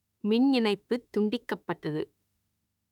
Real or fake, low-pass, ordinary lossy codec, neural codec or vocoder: fake; 19.8 kHz; none; autoencoder, 48 kHz, 32 numbers a frame, DAC-VAE, trained on Japanese speech